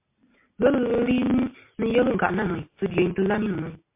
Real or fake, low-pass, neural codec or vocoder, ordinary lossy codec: real; 3.6 kHz; none; MP3, 24 kbps